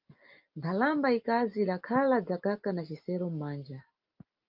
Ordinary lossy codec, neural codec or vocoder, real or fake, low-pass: Opus, 32 kbps; none; real; 5.4 kHz